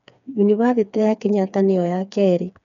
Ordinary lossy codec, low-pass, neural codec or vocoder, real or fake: none; 7.2 kHz; codec, 16 kHz, 4 kbps, FreqCodec, smaller model; fake